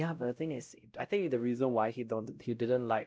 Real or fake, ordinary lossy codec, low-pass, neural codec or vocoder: fake; none; none; codec, 16 kHz, 0.5 kbps, X-Codec, WavLM features, trained on Multilingual LibriSpeech